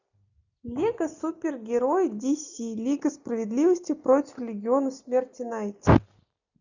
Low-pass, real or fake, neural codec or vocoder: 7.2 kHz; real; none